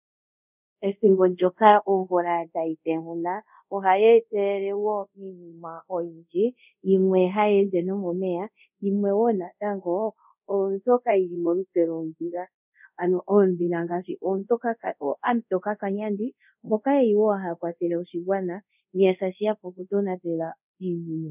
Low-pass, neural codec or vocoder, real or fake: 3.6 kHz; codec, 24 kHz, 0.5 kbps, DualCodec; fake